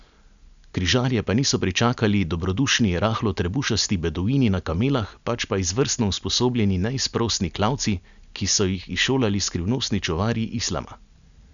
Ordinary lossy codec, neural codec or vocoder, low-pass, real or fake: none; none; 7.2 kHz; real